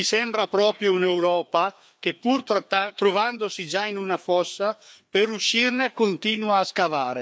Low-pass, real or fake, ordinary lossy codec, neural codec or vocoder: none; fake; none; codec, 16 kHz, 2 kbps, FreqCodec, larger model